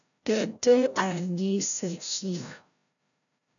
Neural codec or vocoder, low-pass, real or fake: codec, 16 kHz, 0.5 kbps, FreqCodec, larger model; 7.2 kHz; fake